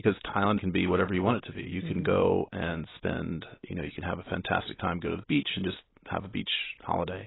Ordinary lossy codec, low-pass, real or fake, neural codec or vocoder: AAC, 16 kbps; 7.2 kHz; real; none